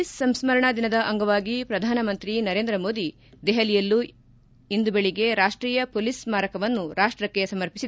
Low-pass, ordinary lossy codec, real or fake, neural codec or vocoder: none; none; real; none